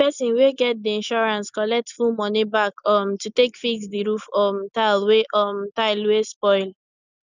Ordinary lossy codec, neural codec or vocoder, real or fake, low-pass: none; none; real; 7.2 kHz